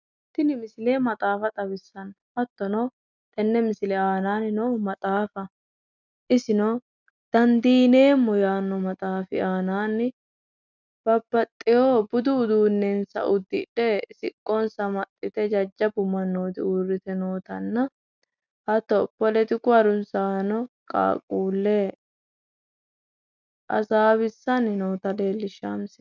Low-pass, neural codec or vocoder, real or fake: 7.2 kHz; none; real